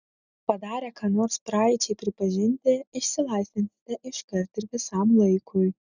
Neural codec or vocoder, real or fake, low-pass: none; real; 7.2 kHz